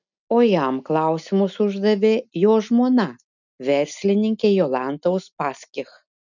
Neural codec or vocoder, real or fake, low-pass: none; real; 7.2 kHz